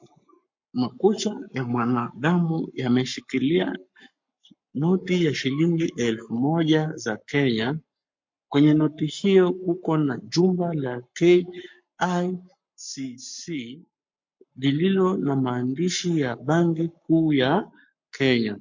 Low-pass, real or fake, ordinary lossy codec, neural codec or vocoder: 7.2 kHz; fake; MP3, 48 kbps; codec, 44.1 kHz, 7.8 kbps, Pupu-Codec